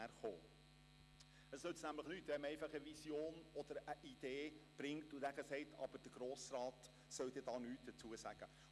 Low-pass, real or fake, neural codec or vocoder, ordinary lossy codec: none; real; none; none